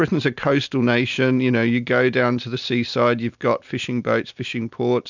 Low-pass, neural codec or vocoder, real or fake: 7.2 kHz; none; real